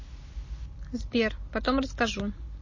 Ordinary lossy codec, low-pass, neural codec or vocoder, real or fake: MP3, 32 kbps; 7.2 kHz; none; real